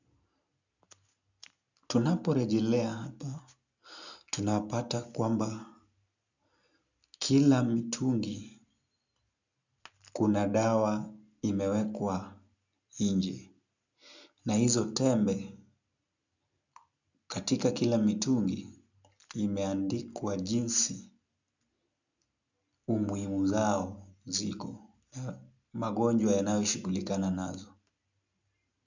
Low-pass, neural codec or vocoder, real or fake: 7.2 kHz; none; real